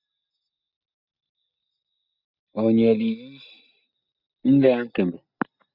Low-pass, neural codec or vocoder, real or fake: 5.4 kHz; none; real